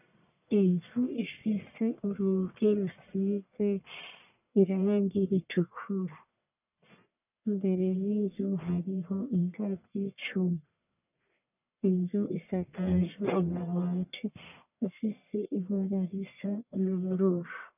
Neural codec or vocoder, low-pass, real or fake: codec, 44.1 kHz, 1.7 kbps, Pupu-Codec; 3.6 kHz; fake